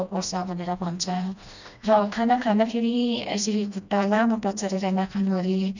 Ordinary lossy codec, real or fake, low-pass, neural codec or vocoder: none; fake; 7.2 kHz; codec, 16 kHz, 1 kbps, FreqCodec, smaller model